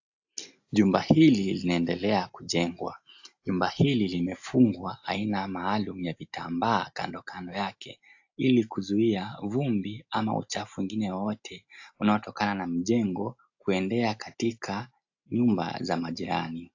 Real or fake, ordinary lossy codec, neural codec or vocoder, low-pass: real; AAC, 48 kbps; none; 7.2 kHz